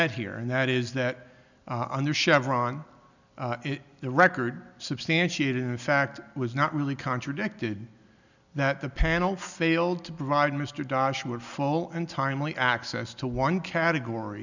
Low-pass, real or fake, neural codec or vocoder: 7.2 kHz; real; none